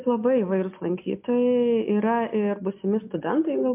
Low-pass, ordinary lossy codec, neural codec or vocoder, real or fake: 3.6 kHz; MP3, 32 kbps; none; real